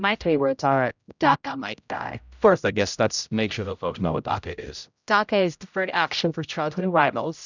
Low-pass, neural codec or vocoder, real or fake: 7.2 kHz; codec, 16 kHz, 0.5 kbps, X-Codec, HuBERT features, trained on general audio; fake